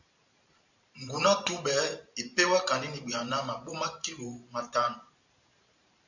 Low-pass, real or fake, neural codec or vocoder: 7.2 kHz; fake; vocoder, 44.1 kHz, 128 mel bands every 512 samples, BigVGAN v2